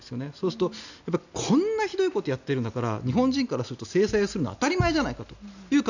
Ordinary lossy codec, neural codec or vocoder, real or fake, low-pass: none; none; real; 7.2 kHz